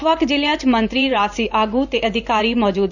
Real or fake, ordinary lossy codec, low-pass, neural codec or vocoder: fake; none; 7.2 kHz; vocoder, 44.1 kHz, 128 mel bands every 512 samples, BigVGAN v2